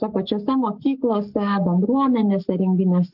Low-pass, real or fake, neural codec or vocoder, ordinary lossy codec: 5.4 kHz; fake; codec, 44.1 kHz, 7.8 kbps, Pupu-Codec; Opus, 24 kbps